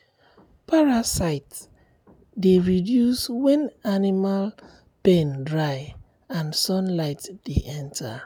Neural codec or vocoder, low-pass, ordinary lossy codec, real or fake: none; none; none; real